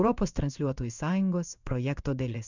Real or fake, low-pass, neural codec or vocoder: fake; 7.2 kHz; codec, 16 kHz in and 24 kHz out, 1 kbps, XY-Tokenizer